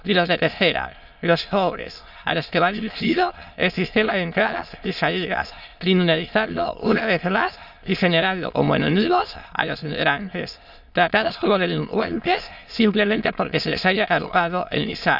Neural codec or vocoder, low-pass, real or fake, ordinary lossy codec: autoencoder, 22.05 kHz, a latent of 192 numbers a frame, VITS, trained on many speakers; 5.4 kHz; fake; none